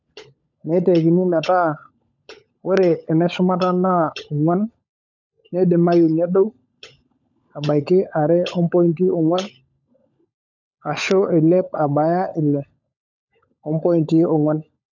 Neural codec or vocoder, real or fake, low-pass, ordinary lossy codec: codec, 16 kHz, 16 kbps, FunCodec, trained on LibriTTS, 50 frames a second; fake; 7.2 kHz; none